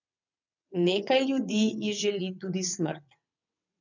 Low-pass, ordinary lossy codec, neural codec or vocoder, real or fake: 7.2 kHz; none; vocoder, 22.05 kHz, 80 mel bands, WaveNeXt; fake